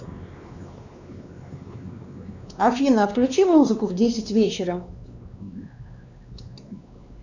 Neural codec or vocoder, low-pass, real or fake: codec, 16 kHz, 2 kbps, X-Codec, WavLM features, trained on Multilingual LibriSpeech; 7.2 kHz; fake